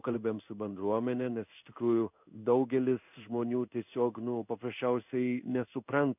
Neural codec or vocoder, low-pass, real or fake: codec, 16 kHz in and 24 kHz out, 1 kbps, XY-Tokenizer; 3.6 kHz; fake